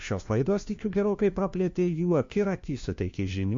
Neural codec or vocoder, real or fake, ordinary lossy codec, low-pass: codec, 16 kHz, 1 kbps, FunCodec, trained on LibriTTS, 50 frames a second; fake; MP3, 48 kbps; 7.2 kHz